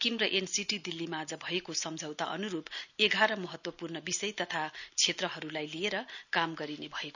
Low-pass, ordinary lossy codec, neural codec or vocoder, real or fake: 7.2 kHz; none; none; real